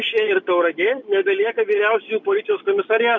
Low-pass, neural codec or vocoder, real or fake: 7.2 kHz; none; real